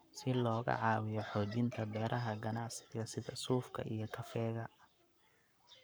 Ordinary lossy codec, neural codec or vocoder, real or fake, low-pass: none; codec, 44.1 kHz, 7.8 kbps, Pupu-Codec; fake; none